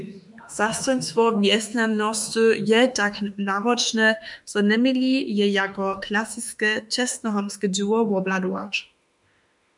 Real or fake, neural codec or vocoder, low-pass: fake; autoencoder, 48 kHz, 32 numbers a frame, DAC-VAE, trained on Japanese speech; 10.8 kHz